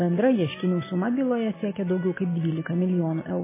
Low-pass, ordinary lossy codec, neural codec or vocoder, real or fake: 3.6 kHz; MP3, 16 kbps; none; real